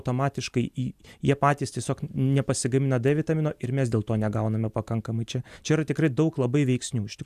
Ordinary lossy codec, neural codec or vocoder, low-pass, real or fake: AAC, 96 kbps; none; 14.4 kHz; real